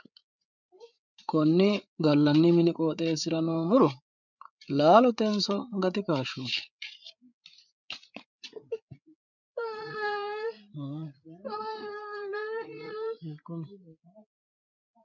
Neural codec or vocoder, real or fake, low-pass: codec, 16 kHz, 8 kbps, FreqCodec, larger model; fake; 7.2 kHz